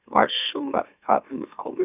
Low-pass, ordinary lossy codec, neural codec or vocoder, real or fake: 3.6 kHz; none; autoencoder, 44.1 kHz, a latent of 192 numbers a frame, MeloTTS; fake